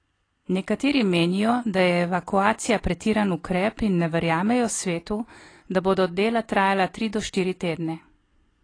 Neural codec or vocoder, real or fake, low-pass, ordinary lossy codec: none; real; 9.9 kHz; AAC, 32 kbps